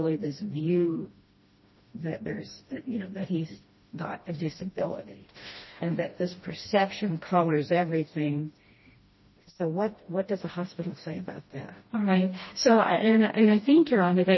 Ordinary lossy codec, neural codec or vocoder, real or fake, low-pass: MP3, 24 kbps; codec, 16 kHz, 1 kbps, FreqCodec, smaller model; fake; 7.2 kHz